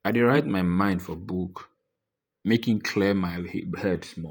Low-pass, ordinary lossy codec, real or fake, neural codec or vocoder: none; none; fake; vocoder, 48 kHz, 128 mel bands, Vocos